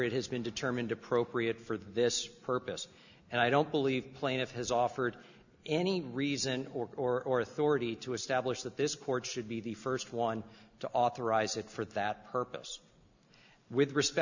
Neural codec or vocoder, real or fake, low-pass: none; real; 7.2 kHz